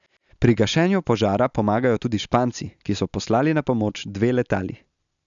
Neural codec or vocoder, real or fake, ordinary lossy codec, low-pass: none; real; none; 7.2 kHz